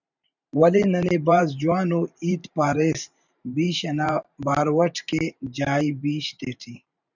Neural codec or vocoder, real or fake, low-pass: vocoder, 44.1 kHz, 128 mel bands every 512 samples, BigVGAN v2; fake; 7.2 kHz